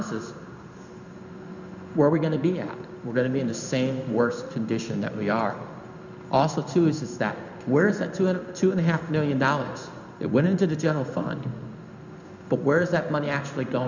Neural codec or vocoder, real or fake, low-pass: codec, 16 kHz in and 24 kHz out, 1 kbps, XY-Tokenizer; fake; 7.2 kHz